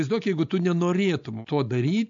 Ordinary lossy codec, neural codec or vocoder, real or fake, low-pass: AAC, 64 kbps; none; real; 7.2 kHz